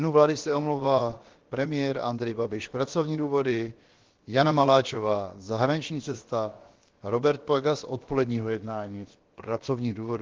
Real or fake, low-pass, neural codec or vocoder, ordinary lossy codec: fake; 7.2 kHz; codec, 16 kHz, about 1 kbps, DyCAST, with the encoder's durations; Opus, 16 kbps